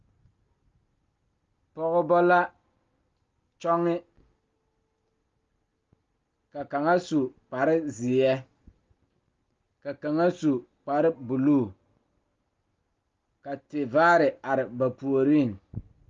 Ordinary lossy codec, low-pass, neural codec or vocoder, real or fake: Opus, 32 kbps; 7.2 kHz; none; real